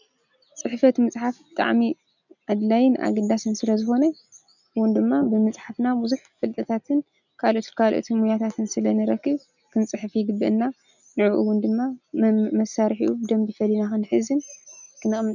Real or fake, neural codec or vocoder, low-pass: real; none; 7.2 kHz